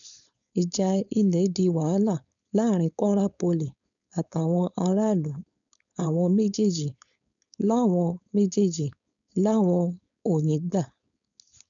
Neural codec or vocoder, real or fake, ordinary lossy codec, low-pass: codec, 16 kHz, 4.8 kbps, FACodec; fake; MP3, 96 kbps; 7.2 kHz